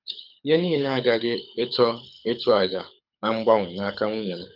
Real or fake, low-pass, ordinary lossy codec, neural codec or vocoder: fake; 5.4 kHz; none; codec, 24 kHz, 6 kbps, HILCodec